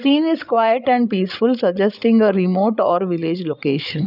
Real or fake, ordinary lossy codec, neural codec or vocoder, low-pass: fake; none; codec, 16 kHz, 16 kbps, FreqCodec, larger model; 5.4 kHz